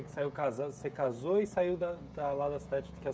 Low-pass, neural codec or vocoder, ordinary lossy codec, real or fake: none; codec, 16 kHz, 16 kbps, FreqCodec, smaller model; none; fake